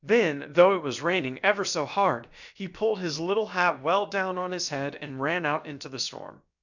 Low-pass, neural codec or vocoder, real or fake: 7.2 kHz; codec, 16 kHz, about 1 kbps, DyCAST, with the encoder's durations; fake